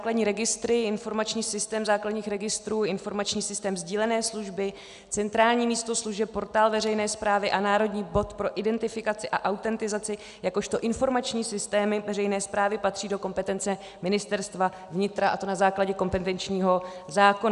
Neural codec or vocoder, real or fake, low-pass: none; real; 10.8 kHz